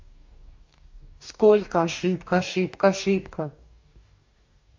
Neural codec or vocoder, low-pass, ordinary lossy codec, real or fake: codec, 44.1 kHz, 2.6 kbps, DAC; 7.2 kHz; MP3, 48 kbps; fake